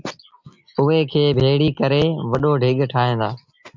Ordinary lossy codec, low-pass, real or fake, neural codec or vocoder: MP3, 64 kbps; 7.2 kHz; real; none